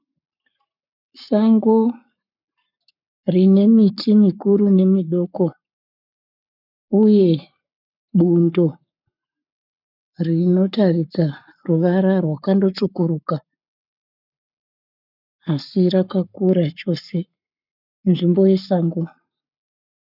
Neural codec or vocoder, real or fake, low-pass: codec, 44.1 kHz, 7.8 kbps, Pupu-Codec; fake; 5.4 kHz